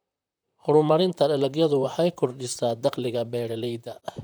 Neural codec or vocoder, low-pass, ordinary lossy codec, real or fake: vocoder, 44.1 kHz, 128 mel bands, Pupu-Vocoder; none; none; fake